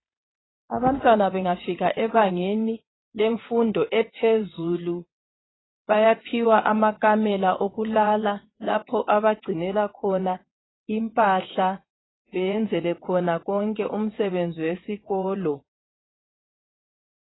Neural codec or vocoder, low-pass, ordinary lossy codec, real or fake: vocoder, 22.05 kHz, 80 mel bands, WaveNeXt; 7.2 kHz; AAC, 16 kbps; fake